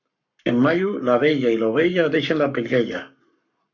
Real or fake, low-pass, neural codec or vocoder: fake; 7.2 kHz; codec, 44.1 kHz, 7.8 kbps, Pupu-Codec